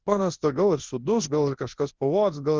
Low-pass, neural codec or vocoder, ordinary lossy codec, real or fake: 7.2 kHz; codec, 24 kHz, 0.9 kbps, WavTokenizer, large speech release; Opus, 16 kbps; fake